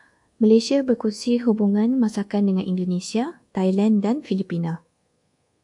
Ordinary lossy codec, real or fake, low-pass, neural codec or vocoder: AAC, 64 kbps; fake; 10.8 kHz; codec, 24 kHz, 1.2 kbps, DualCodec